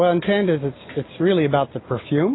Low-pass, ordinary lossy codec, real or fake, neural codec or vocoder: 7.2 kHz; AAC, 16 kbps; real; none